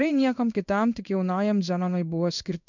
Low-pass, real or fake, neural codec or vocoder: 7.2 kHz; fake; codec, 16 kHz in and 24 kHz out, 1 kbps, XY-Tokenizer